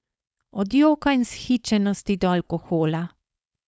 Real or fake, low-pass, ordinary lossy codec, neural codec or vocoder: fake; none; none; codec, 16 kHz, 4.8 kbps, FACodec